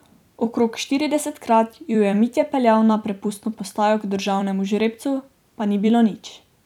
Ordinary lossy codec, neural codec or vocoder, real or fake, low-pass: none; vocoder, 44.1 kHz, 128 mel bands every 256 samples, BigVGAN v2; fake; 19.8 kHz